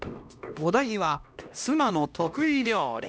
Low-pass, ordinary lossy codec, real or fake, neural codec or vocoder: none; none; fake; codec, 16 kHz, 1 kbps, X-Codec, HuBERT features, trained on LibriSpeech